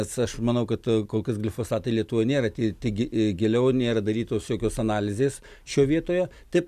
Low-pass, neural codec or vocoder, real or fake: 14.4 kHz; none; real